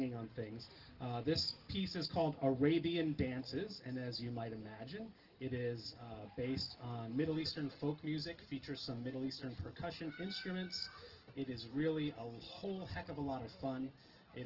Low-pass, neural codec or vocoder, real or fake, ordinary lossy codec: 5.4 kHz; none; real; Opus, 16 kbps